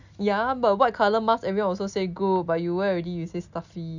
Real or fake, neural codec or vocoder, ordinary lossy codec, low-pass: real; none; none; 7.2 kHz